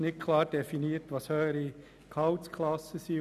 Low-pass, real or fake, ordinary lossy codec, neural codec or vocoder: 14.4 kHz; real; none; none